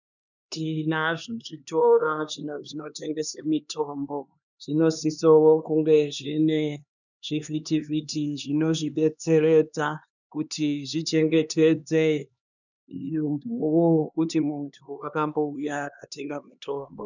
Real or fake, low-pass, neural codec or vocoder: fake; 7.2 kHz; codec, 16 kHz, 2 kbps, X-Codec, HuBERT features, trained on LibriSpeech